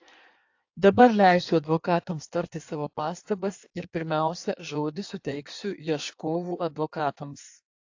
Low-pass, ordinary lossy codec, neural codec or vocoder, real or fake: 7.2 kHz; AAC, 48 kbps; codec, 16 kHz in and 24 kHz out, 1.1 kbps, FireRedTTS-2 codec; fake